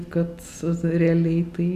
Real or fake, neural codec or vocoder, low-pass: real; none; 14.4 kHz